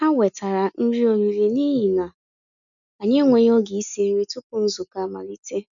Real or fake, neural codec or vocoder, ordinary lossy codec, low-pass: real; none; none; 7.2 kHz